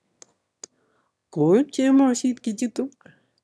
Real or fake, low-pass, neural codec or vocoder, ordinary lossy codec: fake; none; autoencoder, 22.05 kHz, a latent of 192 numbers a frame, VITS, trained on one speaker; none